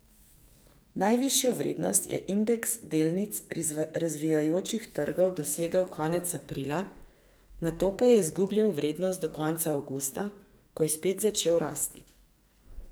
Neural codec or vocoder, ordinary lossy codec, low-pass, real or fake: codec, 44.1 kHz, 2.6 kbps, SNAC; none; none; fake